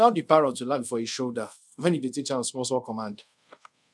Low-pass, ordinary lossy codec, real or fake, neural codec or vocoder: none; none; fake; codec, 24 kHz, 0.5 kbps, DualCodec